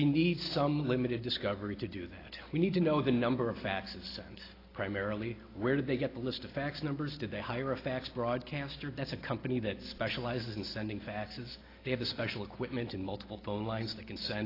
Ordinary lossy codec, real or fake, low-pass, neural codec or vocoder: AAC, 24 kbps; real; 5.4 kHz; none